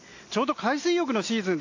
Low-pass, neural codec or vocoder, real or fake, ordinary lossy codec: 7.2 kHz; none; real; AAC, 48 kbps